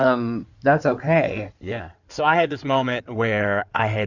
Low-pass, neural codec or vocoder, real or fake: 7.2 kHz; codec, 16 kHz in and 24 kHz out, 2.2 kbps, FireRedTTS-2 codec; fake